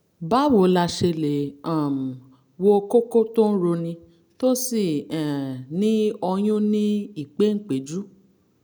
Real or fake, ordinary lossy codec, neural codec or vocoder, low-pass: real; none; none; none